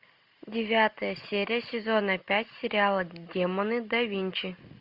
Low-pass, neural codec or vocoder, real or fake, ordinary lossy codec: 5.4 kHz; none; real; MP3, 48 kbps